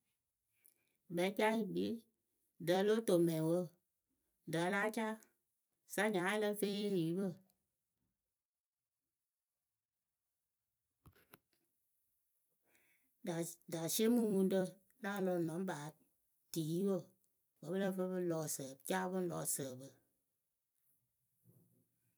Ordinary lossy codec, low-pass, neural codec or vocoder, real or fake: none; none; vocoder, 44.1 kHz, 128 mel bands every 256 samples, BigVGAN v2; fake